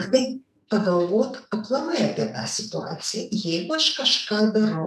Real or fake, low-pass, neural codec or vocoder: fake; 14.4 kHz; codec, 44.1 kHz, 2.6 kbps, SNAC